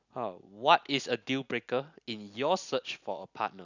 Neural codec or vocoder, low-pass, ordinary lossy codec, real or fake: none; 7.2 kHz; none; real